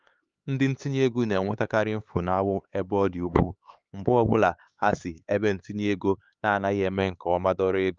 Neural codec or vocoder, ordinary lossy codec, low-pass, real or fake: codec, 16 kHz, 4 kbps, X-Codec, HuBERT features, trained on LibriSpeech; Opus, 32 kbps; 7.2 kHz; fake